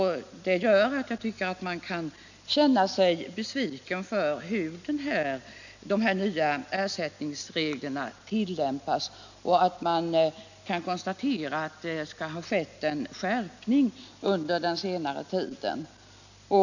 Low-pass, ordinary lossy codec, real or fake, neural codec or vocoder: 7.2 kHz; none; real; none